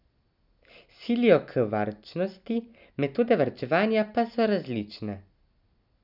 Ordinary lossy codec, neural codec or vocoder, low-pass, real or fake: none; none; 5.4 kHz; real